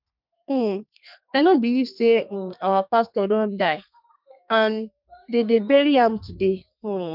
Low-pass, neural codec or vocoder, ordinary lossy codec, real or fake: 5.4 kHz; codec, 32 kHz, 1.9 kbps, SNAC; none; fake